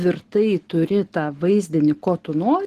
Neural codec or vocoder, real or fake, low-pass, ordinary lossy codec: none; real; 14.4 kHz; Opus, 16 kbps